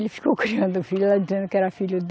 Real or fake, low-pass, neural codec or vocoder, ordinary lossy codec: real; none; none; none